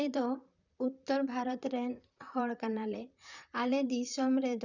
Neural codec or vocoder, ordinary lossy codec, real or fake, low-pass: vocoder, 44.1 kHz, 128 mel bands, Pupu-Vocoder; none; fake; 7.2 kHz